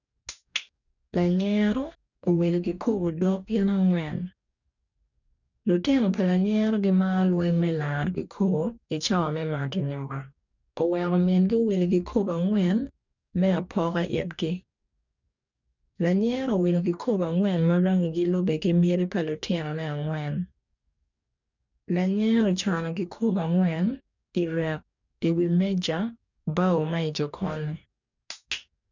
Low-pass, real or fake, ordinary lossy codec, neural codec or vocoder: 7.2 kHz; fake; none; codec, 44.1 kHz, 2.6 kbps, DAC